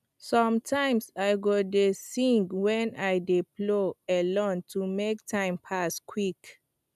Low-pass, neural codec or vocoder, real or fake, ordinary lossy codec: 14.4 kHz; none; real; none